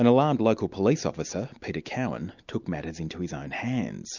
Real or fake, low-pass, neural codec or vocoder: real; 7.2 kHz; none